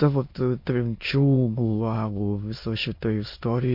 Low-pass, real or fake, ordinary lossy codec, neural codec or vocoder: 5.4 kHz; fake; MP3, 32 kbps; autoencoder, 22.05 kHz, a latent of 192 numbers a frame, VITS, trained on many speakers